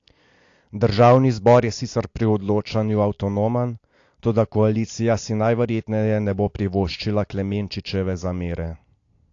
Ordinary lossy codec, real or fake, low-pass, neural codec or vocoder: AAC, 48 kbps; real; 7.2 kHz; none